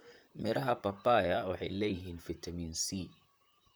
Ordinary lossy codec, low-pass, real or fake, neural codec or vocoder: none; none; fake; vocoder, 44.1 kHz, 128 mel bands, Pupu-Vocoder